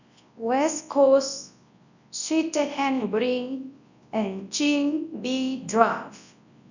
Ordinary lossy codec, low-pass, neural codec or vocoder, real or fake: none; 7.2 kHz; codec, 24 kHz, 0.9 kbps, WavTokenizer, large speech release; fake